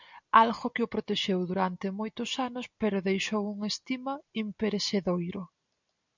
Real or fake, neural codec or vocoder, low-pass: real; none; 7.2 kHz